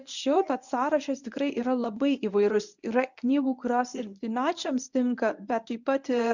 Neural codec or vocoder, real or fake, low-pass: codec, 24 kHz, 0.9 kbps, WavTokenizer, medium speech release version 1; fake; 7.2 kHz